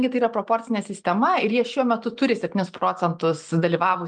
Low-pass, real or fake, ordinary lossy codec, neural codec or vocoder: 10.8 kHz; real; Opus, 32 kbps; none